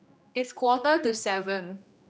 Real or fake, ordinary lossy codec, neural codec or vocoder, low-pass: fake; none; codec, 16 kHz, 1 kbps, X-Codec, HuBERT features, trained on general audio; none